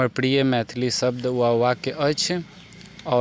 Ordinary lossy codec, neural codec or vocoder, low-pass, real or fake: none; none; none; real